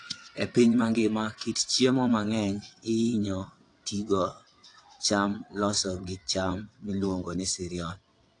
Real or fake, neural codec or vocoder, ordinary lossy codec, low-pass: fake; vocoder, 22.05 kHz, 80 mel bands, WaveNeXt; AAC, 48 kbps; 9.9 kHz